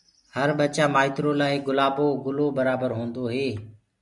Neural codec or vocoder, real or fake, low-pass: none; real; 10.8 kHz